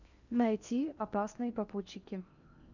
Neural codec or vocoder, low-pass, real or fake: codec, 16 kHz in and 24 kHz out, 0.8 kbps, FocalCodec, streaming, 65536 codes; 7.2 kHz; fake